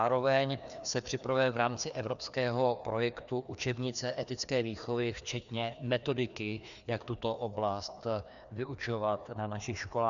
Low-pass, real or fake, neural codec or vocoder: 7.2 kHz; fake; codec, 16 kHz, 2 kbps, FreqCodec, larger model